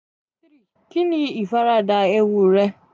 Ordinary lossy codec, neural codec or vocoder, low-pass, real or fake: none; none; none; real